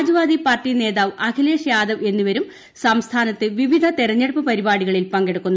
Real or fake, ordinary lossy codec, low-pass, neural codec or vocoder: real; none; none; none